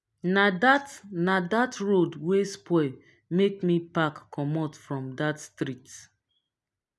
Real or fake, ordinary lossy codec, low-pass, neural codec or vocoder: real; none; none; none